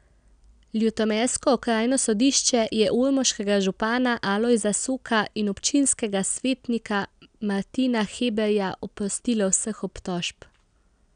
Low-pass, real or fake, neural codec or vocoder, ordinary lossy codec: 9.9 kHz; real; none; none